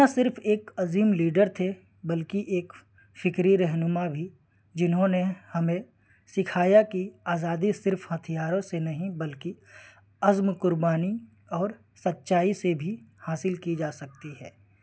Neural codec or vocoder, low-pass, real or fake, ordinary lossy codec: none; none; real; none